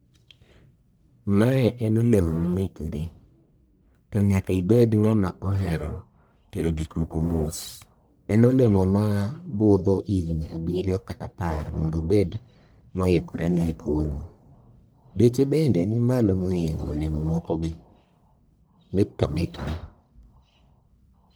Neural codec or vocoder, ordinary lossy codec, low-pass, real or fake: codec, 44.1 kHz, 1.7 kbps, Pupu-Codec; none; none; fake